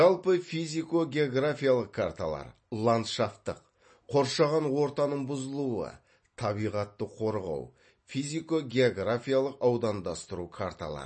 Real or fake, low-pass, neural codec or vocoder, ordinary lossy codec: real; 9.9 kHz; none; MP3, 32 kbps